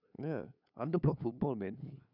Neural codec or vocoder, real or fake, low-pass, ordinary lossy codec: codec, 16 kHz, 8 kbps, FunCodec, trained on LibriTTS, 25 frames a second; fake; 5.4 kHz; none